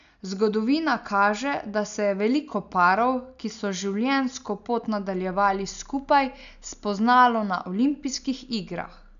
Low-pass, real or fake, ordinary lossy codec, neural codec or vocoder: 7.2 kHz; real; none; none